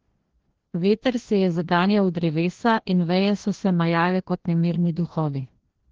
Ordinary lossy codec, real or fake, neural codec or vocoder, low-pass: Opus, 16 kbps; fake; codec, 16 kHz, 1 kbps, FreqCodec, larger model; 7.2 kHz